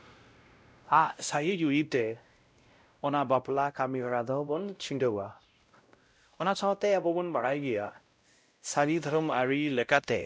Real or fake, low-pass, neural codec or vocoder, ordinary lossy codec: fake; none; codec, 16 kHz, 0.5 kbps, X-Codec, WavLM features, trained on Multilingual LibriSpeech; none